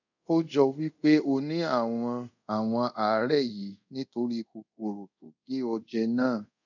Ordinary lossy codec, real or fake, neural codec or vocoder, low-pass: none; fake; codec, 24 kHz, 0.5 kbps, DualCodec; 7.2 kHz